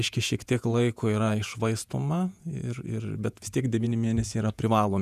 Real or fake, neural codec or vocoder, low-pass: fake; vocoder, 48 kHz, 128 mel bands, Vocos; 14.4 kHz